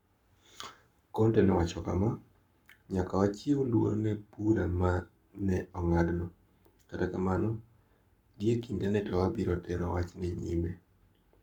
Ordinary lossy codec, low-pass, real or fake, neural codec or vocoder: none; 19.8 kHz; fake; codec, 44.1 kHz, 7.8 kbps, Pupu-Codec